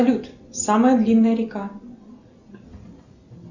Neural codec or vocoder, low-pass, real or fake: none; 7.2 kHz; real